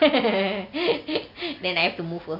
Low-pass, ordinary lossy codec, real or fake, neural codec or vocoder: 5.4 kHz; Opus, 64 kbps; real; none